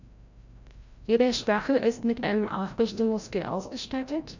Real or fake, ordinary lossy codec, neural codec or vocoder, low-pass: fake; none; codec, 16 kHz, 0.5 kbps, FreqCodec, larger model; 7.2 kHz